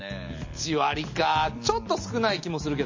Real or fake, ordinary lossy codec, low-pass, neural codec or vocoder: real; MP3, 32 kbps; 7.2 kHz; none